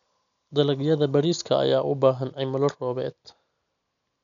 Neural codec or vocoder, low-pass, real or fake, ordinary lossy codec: none; 7.2 kHz; real; none